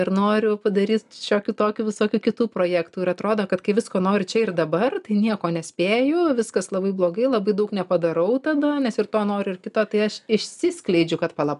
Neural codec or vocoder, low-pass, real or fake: none; 10.8 kHz; real